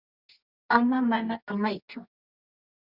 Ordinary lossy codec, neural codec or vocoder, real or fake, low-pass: Opus, 64 kbps; codec, 24 kHz, 0.9 kbps, WavTokenizer, medium music audio release; fake; 5.4 kHz